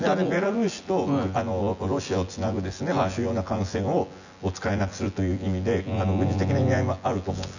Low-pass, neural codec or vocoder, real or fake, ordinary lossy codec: 7.2 kHz; vocoder, 24 kHz, 100 mel bands, Vocos; fake; none